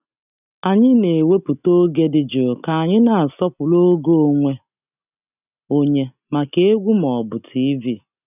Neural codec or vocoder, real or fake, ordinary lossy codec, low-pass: none; real; none; 3.6 kHz